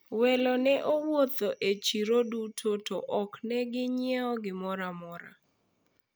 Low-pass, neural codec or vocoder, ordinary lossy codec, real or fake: none; none; none; real